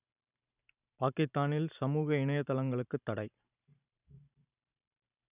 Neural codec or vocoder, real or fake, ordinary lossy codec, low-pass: vocoder, 44.1 kHz, 128 mel bands every 512 samples, BigVGAN v2; fake; none; 3.6 kHz